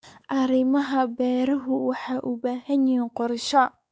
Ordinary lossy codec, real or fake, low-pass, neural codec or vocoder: none; fake; none; codec, 16 kHz, 4 kbps, X-Codec, WavLM features, trained on Multilingual LibriSpeech